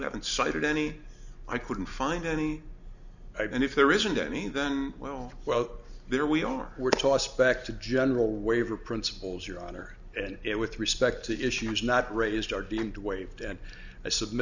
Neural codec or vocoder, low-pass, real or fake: none; 7.2 kHz; real